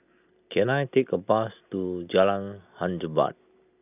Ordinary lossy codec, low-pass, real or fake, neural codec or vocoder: none; 3.6 kHz; real; none